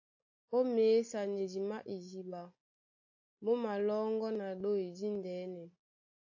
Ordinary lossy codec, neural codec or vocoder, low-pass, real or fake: AAC, 48 kbps; none; 7.2 kHz; real